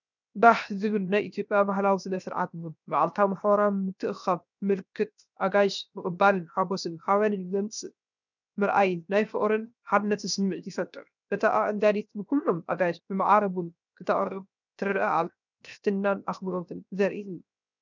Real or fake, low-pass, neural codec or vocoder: fake; 7.2 kHz; codec, 16 kHz, 0.7 kbps, FocalCodec